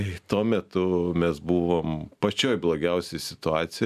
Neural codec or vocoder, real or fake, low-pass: none; real; 14.4 kHz